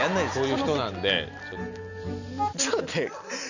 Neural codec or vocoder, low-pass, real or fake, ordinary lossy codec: none; 7.2 kHz; real; none